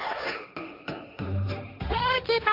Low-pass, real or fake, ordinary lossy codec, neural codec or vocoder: 5.4 kHz; fake; none; codec, 16 kHz, 1.1 kbps, Voila-Tokenizer